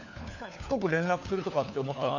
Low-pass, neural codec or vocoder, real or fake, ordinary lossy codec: 7.2 kHz; codec, 16 kHz, 4 kbps, FunCodec, trained on LibriTTS, 50 frames a second; fake; none